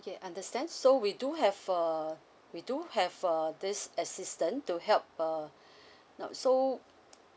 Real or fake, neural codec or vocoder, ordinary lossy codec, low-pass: real; none; none; none